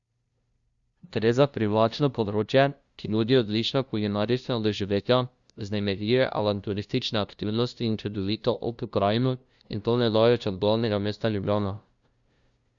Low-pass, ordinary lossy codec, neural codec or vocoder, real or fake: 7.2 kHz; Opus, 64 kbps; codec, 16 kHz, 0.5 kbps, FunCodec, trained on LibriTTS, 25 frames a second; fake